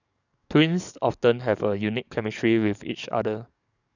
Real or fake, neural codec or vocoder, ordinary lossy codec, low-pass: fake; codec, 44.1 kHz, 7.8 kbps, DAC; none; 7.2 kHz